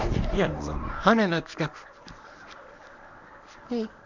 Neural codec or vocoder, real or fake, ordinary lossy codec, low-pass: codec, 24 kHz, 0.9 kbps, WavTokenizer, medium speech release version 1; fake; none; 7.2 kHz